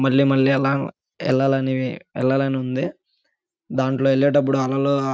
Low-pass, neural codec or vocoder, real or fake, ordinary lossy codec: none; none; real; none